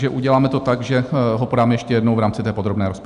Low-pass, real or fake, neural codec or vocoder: 10.8 kHz; real; none